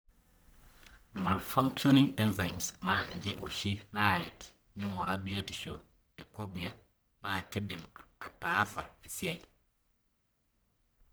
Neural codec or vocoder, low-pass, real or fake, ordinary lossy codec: codec, 44.1 kHz, 1.7 kbps, Pupu-Codec; none; fake; none